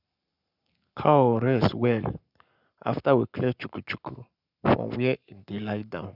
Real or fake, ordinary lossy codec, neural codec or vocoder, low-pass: fake; none; codec, 44.1 kHz, 7.8 kbps, Pupu-Codec; 5.4 kHz